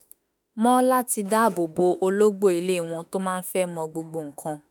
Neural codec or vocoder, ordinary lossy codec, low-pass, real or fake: autoencoder, 48 kHz, 32 numbers a frame, DAC-VAE, trained on Japanese speech; none; none; fake